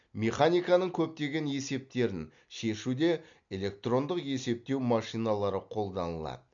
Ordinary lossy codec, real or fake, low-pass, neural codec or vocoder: MP3, 64 kbps; real; 7.2 kHz; none